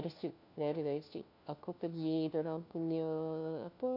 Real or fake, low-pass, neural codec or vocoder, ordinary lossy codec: fake; 5.4 kHz; codec, 16 kHz, 1 kbps, FunCodec, trained on LibriTTS, 50 frames a second; none